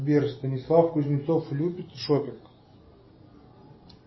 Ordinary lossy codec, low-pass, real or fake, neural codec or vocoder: MP3, 24 kbps; 7.2 kHz; real; none